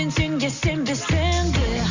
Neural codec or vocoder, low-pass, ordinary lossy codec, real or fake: none; 7.2 kHz; Opus, 64 kbps; real